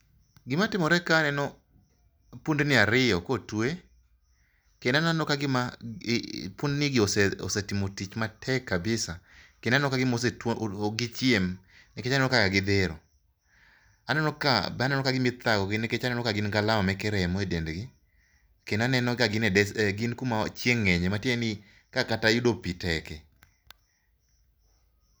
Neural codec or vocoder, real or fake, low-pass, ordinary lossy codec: none; real; none; none